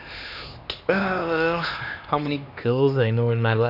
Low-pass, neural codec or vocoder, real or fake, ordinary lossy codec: 5.4 kHz; codec, 16 kHz, 2 kbps, X-Codec, HuBERT features, trained on LibriSpeech; fake; none